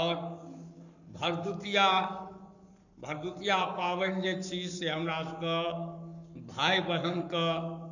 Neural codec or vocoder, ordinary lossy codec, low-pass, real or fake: codec, 44.1 kHz, 7.8 kbps, Pupu-Codec; none; 7.2 kHz; fake